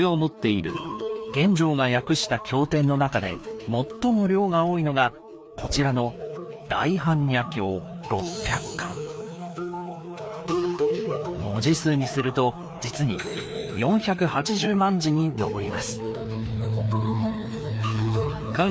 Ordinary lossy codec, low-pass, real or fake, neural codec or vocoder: none; none; fake; codec, 16 kHz, 2 kbps, FreqCodec, larger model